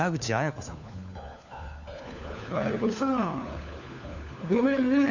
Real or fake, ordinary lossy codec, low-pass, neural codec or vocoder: fake; none; 7.2 kHz; codec, 16 kHz, 4 kbps, FunCodec, trained on LibriTTS, 50 frames a second